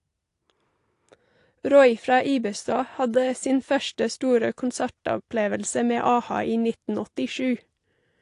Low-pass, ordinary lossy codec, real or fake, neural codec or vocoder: 10.8 kHz; AAC, 48 kbps; real; none